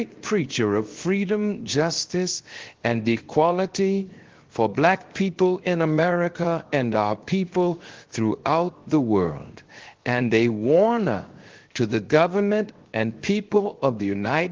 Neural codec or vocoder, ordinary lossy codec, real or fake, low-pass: codec, 16 kHz, 0.7 kbps, FocalCodec; Opus, 16 kbps; fake; 7.2 kHz